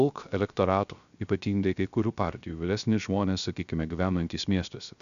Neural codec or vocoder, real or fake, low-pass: codec, 16 kHz, 0.3 kbps, FocalCodec; fake; 7.2 kHz